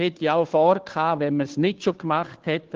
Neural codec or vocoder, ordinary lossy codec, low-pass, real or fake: codec, 16 kHz, 2 kbps, FunCodec, trained on Chinese and English, 25 frames a second; Opus, 16 kbps; 7.2 kHz; fake